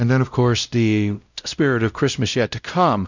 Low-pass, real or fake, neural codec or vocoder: 7.2 kHz; fake; codec, 16 kHz, 0.5 kbps, X-Codec, WavLM features, trained on Multilingual LibriSpeech